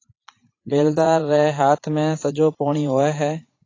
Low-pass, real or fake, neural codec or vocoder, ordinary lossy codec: 7.2 kHz; fake; vocoder, 44.1 kHz, 80 mel bands, Vocos; AAC, 32 kbps